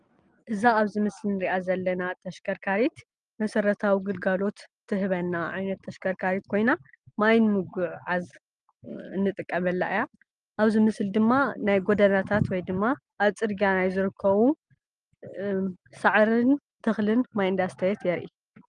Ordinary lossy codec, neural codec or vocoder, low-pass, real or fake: Opus, 32 kbps; none; 9.9 kHz; real